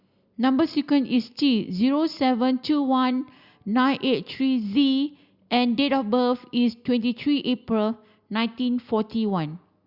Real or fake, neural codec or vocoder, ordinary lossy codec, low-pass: real; none; Opus, 64 kbps; 5.4 kHz